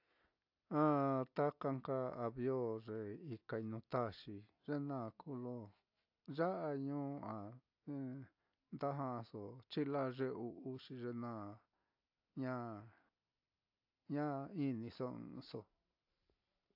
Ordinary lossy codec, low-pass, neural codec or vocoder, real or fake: AAC, 48 kbps; 5.4 kHz; none; real